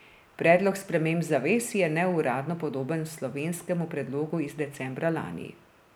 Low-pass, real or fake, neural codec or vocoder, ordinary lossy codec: none; real; none; none